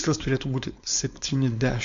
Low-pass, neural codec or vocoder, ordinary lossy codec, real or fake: 7.2 kHz; codec, 16 kHz, 4.8 kbps, FACodec; MP3, 64 kbps; fake